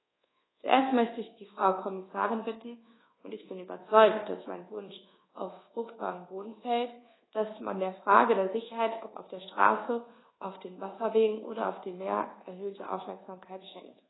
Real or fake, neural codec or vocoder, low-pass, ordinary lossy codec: fake; codec, 24 kHz, 1.2 kbps, DualCodec; 7.2 kHz; AAC, 16 kbps